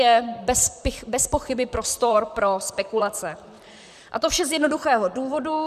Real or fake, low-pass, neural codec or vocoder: fake; 14.4 kHz; vocoder, 44.1 kHz, 128 mel bands, Pupu-Vocoder